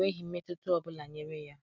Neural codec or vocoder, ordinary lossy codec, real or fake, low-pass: none; none; real; 7.2 kHz